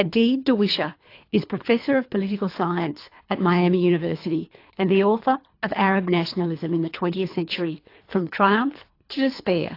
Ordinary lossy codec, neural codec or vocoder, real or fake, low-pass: AAC, 32 kbps; codec, 24 kHz, 3 kbps, HILCodec; fake; 5.4 kHz